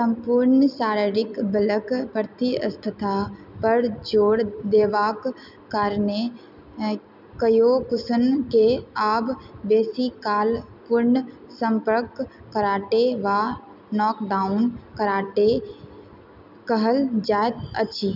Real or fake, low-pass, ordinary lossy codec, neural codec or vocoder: real; 5.4 kHz; none; none